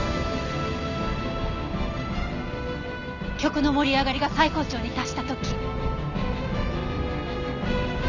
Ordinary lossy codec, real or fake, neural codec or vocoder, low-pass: none; real; none; 7.2 kHz